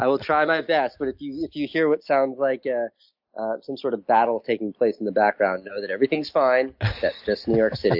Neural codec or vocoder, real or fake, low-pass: none; real; 5.4 kHz